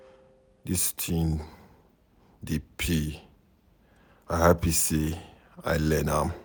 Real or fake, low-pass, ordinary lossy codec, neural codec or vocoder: real; none; none; none